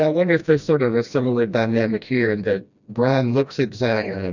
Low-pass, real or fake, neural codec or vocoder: 7.2 kHz; fake; codec, 16 kHz, 1 kbps, FreqCodec, smaller model